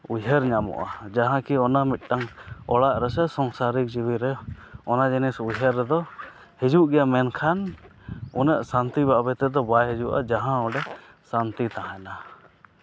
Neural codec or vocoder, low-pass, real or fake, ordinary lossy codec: none; none; real; none